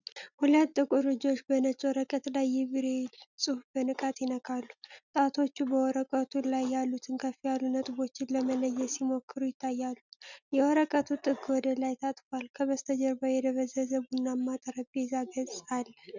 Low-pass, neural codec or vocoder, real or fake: 7.2 kHz; none; real